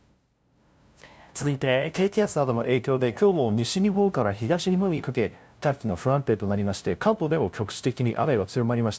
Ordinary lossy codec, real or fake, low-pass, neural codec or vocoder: none; fake; none; codec, 16 kHz, 0.5 kbps, FunCodec, trained on LibriTTS, 25 frames a second